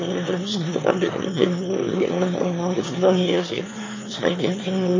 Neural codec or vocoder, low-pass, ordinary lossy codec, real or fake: autoencoder, 22.05 kHz, a latent of 192 numbers a frame, VITS, trained on one speaker; 7.2 kHz; MP3, 32 kbps; fake